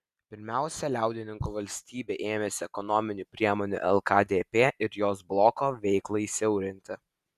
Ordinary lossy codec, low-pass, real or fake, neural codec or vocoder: Opus, 64 kbps; 14.4 kHz; real; none